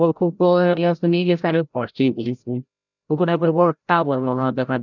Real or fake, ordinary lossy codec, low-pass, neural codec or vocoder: fake; none; 7.2 kHz; codec, 16 kHz, 0.5 kbps, FreqCodec, larger model